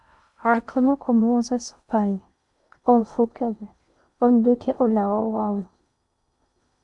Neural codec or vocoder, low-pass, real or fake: codec, 16 kHz in and 24 kHz out, 0.6 kbps, FocalCodec, streaming, 2048 codes; 10.8 kHz; fake